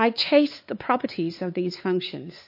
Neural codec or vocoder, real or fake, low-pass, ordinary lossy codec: codec, 16 kHz, 4 kbps, X-Codec, WavLM features, trained on Multilingual LibriSpeech; fake; 5.4 kHz; MP3, 32 kbps